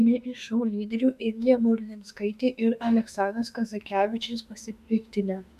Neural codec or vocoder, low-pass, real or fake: autoencoder, 48 kHz, 32 numbers a frame, DAC-VAE, trained on Japanese speech; 14.4 kHz; fake